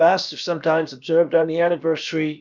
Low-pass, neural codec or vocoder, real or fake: 7.2 kHz; codec, 16 kHz, about 1 kbps, DyCAST, with the encoder's durations; fake